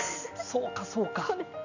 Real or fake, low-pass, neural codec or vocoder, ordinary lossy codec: real; 7.2 kHz; none; none